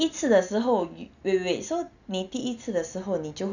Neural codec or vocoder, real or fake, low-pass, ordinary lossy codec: none; real; 7.2 kHz; none